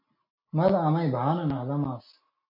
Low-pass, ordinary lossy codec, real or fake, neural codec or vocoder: 5.4 kHz; MP3, 32 kbps; real; none